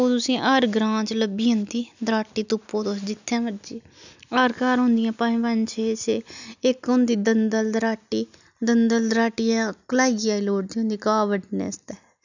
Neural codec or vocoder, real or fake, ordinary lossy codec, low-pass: none; real; none; 7.2 kHz